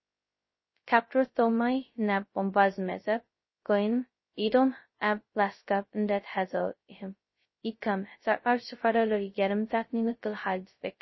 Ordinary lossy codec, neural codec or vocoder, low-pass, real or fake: MP3, 24 kbps; codec, 16 kHz, 0.2 kbps, FocalCodec; 7.2 kHz; fake